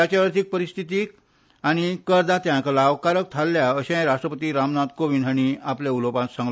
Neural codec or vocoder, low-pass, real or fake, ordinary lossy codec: none; none; real; none